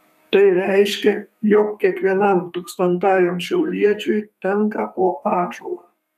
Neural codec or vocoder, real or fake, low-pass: codec, 32 kHz, 1.9 kbps, SNAC; fake; 14.4 kHz